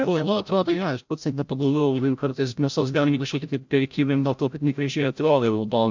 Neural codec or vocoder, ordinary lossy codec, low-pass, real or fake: codec, 16 kHz, 0.5 kbps, FreqCodec, larger model; MP3, 64 kbps; 7.2 kHz; fake